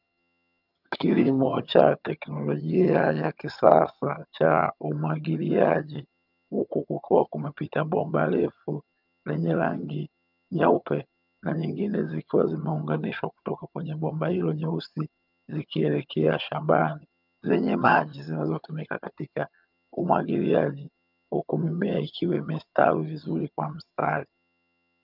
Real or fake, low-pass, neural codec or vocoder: fake; 5.4 kHz; vocoder, 22.05 kHz, 80 mel bands, HiFi-GAN